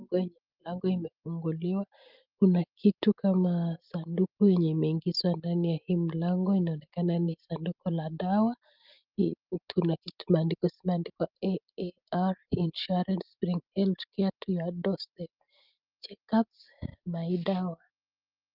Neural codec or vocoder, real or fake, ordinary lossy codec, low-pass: none; real; Opus, 32 kbps; 5.4 kHz